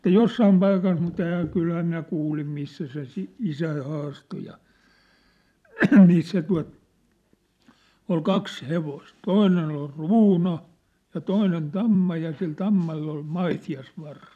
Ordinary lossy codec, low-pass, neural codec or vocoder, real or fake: none; 14.4 kHz; vocoder, 44.1 kHz, 128 mel bands every 256 samples, BigVGAN v2; fake